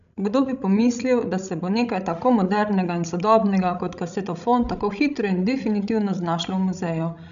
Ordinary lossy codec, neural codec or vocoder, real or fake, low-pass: none; codec, 16 kHz, 16 kbps, FreqCodec, larger model; fake; 7.2 kHz